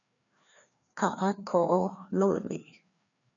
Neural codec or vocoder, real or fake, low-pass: codec, 16 kHz, 2 kbps, FreqCodec, larger model; fake; 7.2 kHz